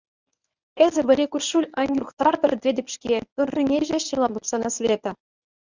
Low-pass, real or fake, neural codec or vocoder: 7.2 kHz; fake; codec, 24 kHz, 0.9 kbps, WavTokenizer, medium speech release version 1